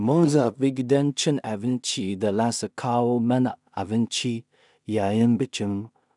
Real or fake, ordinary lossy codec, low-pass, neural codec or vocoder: fake; none; 10.8 kHz; codec, 16 kHz in and 24 kHz out, 0.4 kbps, LongCat-Audio-Codec, two codebook decoder